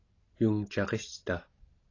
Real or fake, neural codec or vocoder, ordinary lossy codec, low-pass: real; none; AAC, 32 kbps; 7.2 kHz